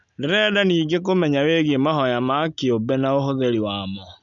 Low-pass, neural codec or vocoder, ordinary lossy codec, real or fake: 7.2 kHz; none; none; real